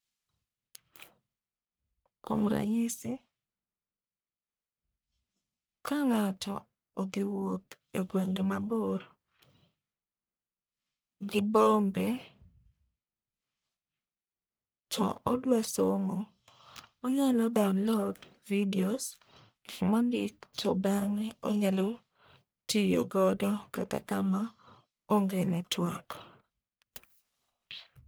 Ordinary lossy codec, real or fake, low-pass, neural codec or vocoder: none; fake; none; codec, 44.1 kHz, 1.7 kbps, Pupu-Codec